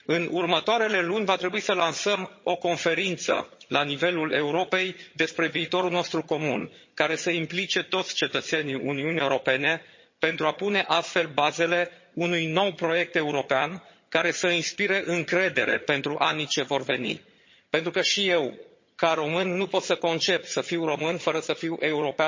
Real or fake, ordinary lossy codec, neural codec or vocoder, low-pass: fake; MP3, 32 kbps; vocoder, 22.05 kHz, 80 mel bands, HiFi-GAN; 7.2 kHz